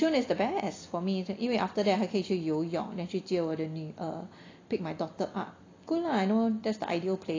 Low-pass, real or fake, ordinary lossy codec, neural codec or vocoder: 7.2 kHz; real; AAC, 32 kbps; none